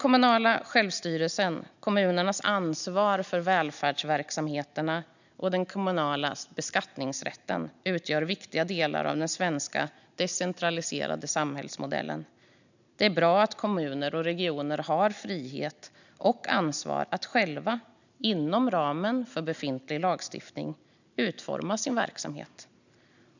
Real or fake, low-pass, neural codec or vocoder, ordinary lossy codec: real; 7.2 kHz; none; none